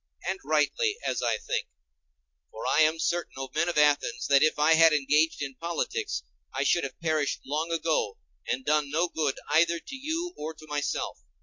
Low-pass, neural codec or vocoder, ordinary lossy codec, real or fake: 7.2 kHz; none; MP3, 48 kbps; real